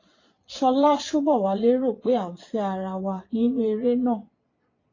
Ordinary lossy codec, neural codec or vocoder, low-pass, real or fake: AAC, 32 kbps; vocoder, 22.05 kHz, 80 mel bands, Vocos; 7.2 kHz; fake